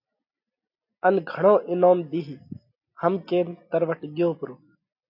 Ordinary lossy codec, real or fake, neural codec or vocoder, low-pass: AAC, 48 kbps; real; none; 5.4 kHz